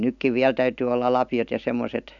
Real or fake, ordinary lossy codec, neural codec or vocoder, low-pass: real; none; none; 7.2 kHz